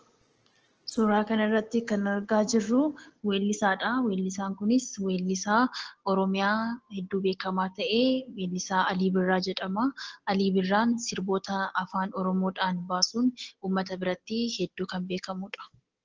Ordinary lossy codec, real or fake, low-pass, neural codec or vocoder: Opus, 16 kbps; real; 7.2 kHz; none